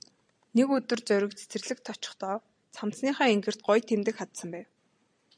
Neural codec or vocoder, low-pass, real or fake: none; 9.9 kHz; real